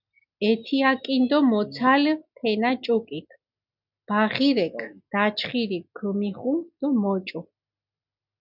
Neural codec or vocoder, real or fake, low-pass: none; real; 5.4 kHz